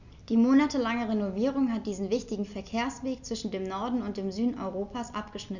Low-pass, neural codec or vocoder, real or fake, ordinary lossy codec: 7.2 kHz; none; real; none